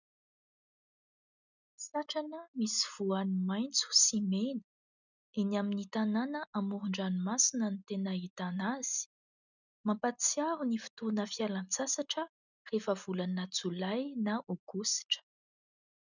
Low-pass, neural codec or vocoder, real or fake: 7.2 kHz; none; real